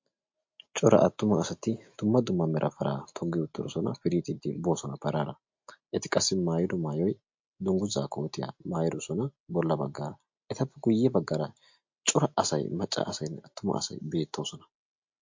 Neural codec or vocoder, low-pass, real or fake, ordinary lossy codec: none; 7.2 kHz; real; MP3, 48 kbps